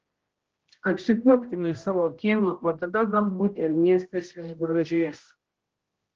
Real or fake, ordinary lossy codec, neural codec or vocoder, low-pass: fake; Opus, 16 kbps; codec, 16 kHz, 0.5 kbps, X-Codec, HuBERT features, trained on general audio; 7.2 kHz